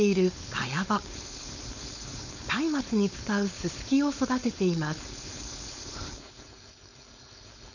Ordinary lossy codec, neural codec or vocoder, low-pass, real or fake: none; codec, 16 kHz, 4.8 kbps, FACodec; 7.2 kHz; fake